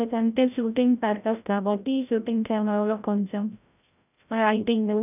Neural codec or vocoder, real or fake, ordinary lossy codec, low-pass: codec, 16 kHz, 0.5 kbps, FreqCodec, larger model; fake; none; 3.6 kHz